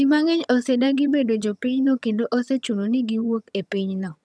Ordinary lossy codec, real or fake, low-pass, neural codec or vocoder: none; fake; none; vocoder, 22.05 kHz, 80 mel bands, HiFi-GAN